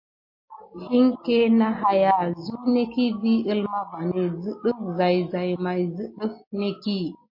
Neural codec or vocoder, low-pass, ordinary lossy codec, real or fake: none; 5.4 kHz; MP3, 32 kbps; real